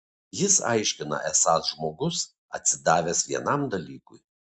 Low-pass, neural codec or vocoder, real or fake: 10.8 kHz; none; real